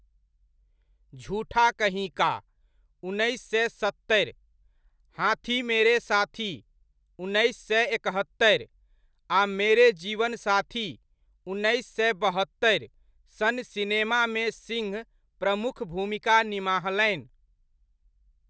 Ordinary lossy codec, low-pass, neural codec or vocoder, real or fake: none; none; none; real